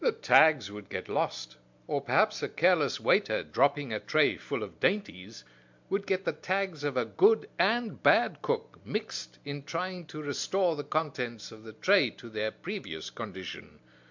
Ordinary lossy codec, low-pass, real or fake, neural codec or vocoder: MP3, 64 kbps; 7.2 kHz; real; none